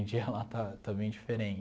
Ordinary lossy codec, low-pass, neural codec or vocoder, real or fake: none; none; none; real